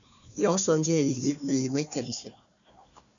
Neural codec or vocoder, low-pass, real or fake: codec, 16 kHz, 1 kbps, FunCodec, trained on Chinese and English, 50 frames a second; 7.2 kHz; fake